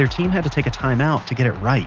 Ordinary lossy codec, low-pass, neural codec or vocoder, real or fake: Opus, 16 kbps; 7.2 kHz; none; real